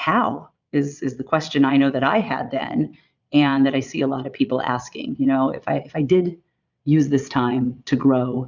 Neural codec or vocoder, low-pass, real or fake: vocoder, 22.05 kHz, 80 mel bands, Vocos; 7.2 kHz; fake